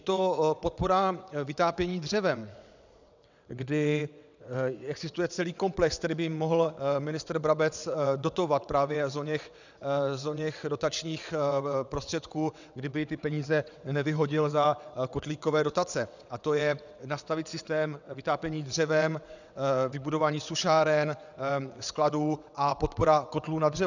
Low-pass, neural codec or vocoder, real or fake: 7.2 kHz; vocoder, 22.05 kHz, 80 mel bands, WaveNeXt; fake